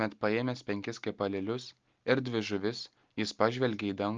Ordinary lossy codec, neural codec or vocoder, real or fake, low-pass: Opus, 24 kbps; none; real; 7.2 kHz